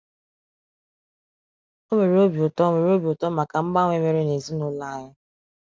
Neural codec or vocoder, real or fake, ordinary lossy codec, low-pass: none; real; none; none